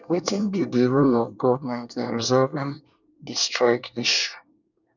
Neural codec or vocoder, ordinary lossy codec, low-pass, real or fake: codec, 24 kHz, 1 kbps, SNAC; none; 7.2 kHz; fake